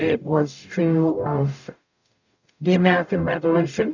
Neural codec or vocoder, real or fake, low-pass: codec, 44.1 kHz, 0.9 kbps, DAC; fake; 7.2 kHz